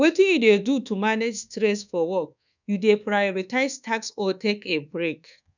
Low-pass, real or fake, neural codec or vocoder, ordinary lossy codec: 7.2 kHz; fake; codec, 24 kHz, 1.2 kbps, DualCodec; none